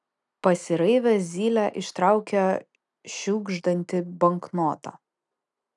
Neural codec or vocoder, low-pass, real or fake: none; 10.8 kHz; real